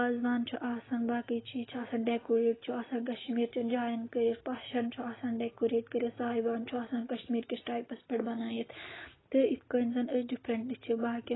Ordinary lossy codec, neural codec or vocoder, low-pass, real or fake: AAC, 16 kbps; none; 7.2 kHz; real